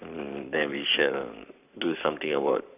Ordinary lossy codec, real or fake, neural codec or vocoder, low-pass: none; fake; codec, 44.1 kHz, 7.8 kbps, Pupu-Codec; 3.6 kHz